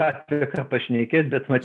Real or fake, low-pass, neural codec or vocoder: real; 10.8 kHz; none